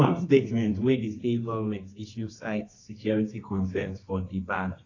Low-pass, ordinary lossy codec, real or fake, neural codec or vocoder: 7.2 kHz; AAC, 32 kbps; fake; codec, 24 kHz, 0.9 kbps, WavTokenizer, medium music audio release